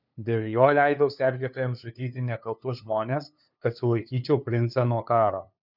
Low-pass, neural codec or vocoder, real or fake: 5.4 kHz; codec, 16 kHz, 2 kbps, FunCodec, trained on LibriTTS, 25 frames a second; fake